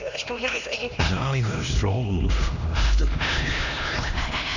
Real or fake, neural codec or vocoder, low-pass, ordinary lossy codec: fake; codec, 16 kHz, 1 kbps, X-Codec, HuBERT features, trained on LibriSpeech; 7.2 kHz; none